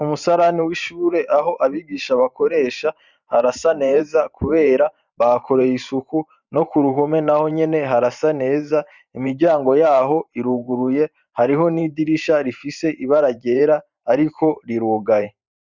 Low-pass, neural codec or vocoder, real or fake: 7.2 kHz; vocoder, 44.1 kHz, 128 mel bands every 512 samples, BigVGAN v2; fake